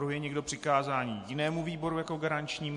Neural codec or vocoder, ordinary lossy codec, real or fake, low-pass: none; MP3, 48 kbps; real; 10.8 kHz